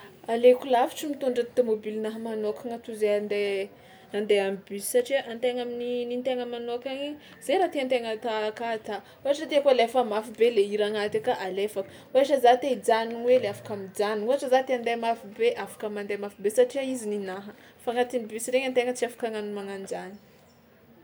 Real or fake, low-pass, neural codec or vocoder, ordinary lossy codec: real; none; none; none